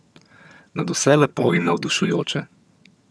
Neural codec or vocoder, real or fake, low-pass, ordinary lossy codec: vocoder, 22.05 kHz, 80 mel bands, HiFi-GAN; fake; none; none